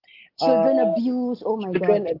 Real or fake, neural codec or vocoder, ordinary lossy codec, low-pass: real; none; Opus, 32 kbps; 5.4 kHz